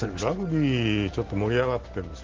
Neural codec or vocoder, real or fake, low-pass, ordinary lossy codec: none; real; 7.2 kHz; Opus, 16 kbps